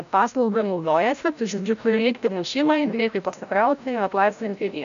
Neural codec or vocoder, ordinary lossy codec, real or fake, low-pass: codec, 16 kHz, 0.5 kbps, FreqCodec, larger model; AAC, 96 kbps; fake; 7.2 kHz